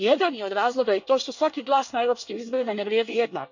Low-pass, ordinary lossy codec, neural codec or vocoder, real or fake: 7.2 kHz; none; codec, 24 kHz, 1 kbps, SNAC; fake